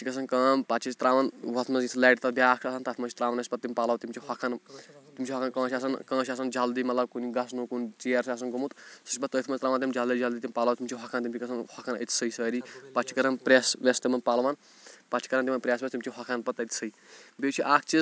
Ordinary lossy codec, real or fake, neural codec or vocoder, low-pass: none; real; none; none